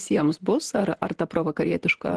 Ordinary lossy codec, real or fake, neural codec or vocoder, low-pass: Opus, 16 kbps; fake; vocoder, 48 kHz, 128 mel bands, Vocos; 10.8 kHz